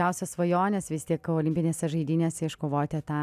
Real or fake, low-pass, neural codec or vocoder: real; 14.4 kHz; none